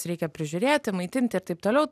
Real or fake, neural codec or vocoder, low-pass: real; none; 14.4 kHz